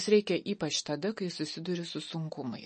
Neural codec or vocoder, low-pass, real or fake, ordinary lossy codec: none; 10.8 kHz; real; MP3, 32 kbps